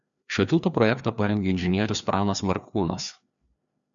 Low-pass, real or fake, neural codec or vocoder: 7.2 kHz; fake; codec, 16 kHz, 2 kbps, FreqCodec, larger model